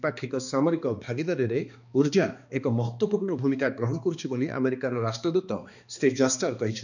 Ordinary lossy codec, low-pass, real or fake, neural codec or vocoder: none; 7.2 kHz; fake; codec, 16 kHz, 2 kbps, X-Codec, HuBERT features, trained on balanced general audio